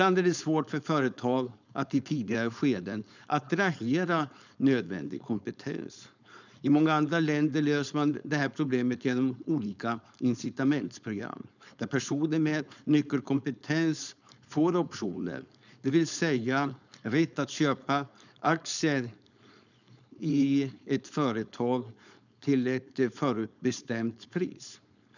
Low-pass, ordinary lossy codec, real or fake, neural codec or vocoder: 7.2 kHz; none; fake; codec, 16 kHz, 4.8 kbps, FACodec